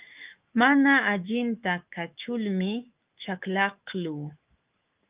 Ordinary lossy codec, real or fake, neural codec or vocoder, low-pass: Opus, 24 kbps; fake; autoencoder, 48 kHz, 128 numbers a frame, DAC-VAE, trained on Japanese speech; 3.6 kHz